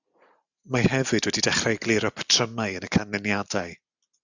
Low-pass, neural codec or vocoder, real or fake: 7.2 kHz; none; real